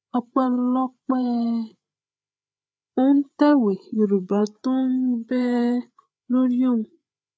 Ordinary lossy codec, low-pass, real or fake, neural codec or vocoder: none; none; fake; codec, 16 kHz, 8 kbps, FreqCodec, larger model